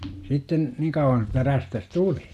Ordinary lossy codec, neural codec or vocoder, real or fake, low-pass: none; none; real; 14.4 kHz